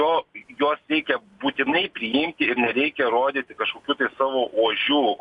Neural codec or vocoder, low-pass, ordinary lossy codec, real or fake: none; 10.8 kHz; AAC, 64 kbps; real